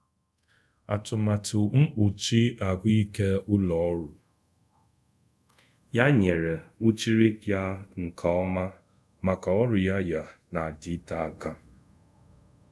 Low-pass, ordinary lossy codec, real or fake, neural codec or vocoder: none; none; fake; codec, 24 kHz, 0.5 kbps, DualCodec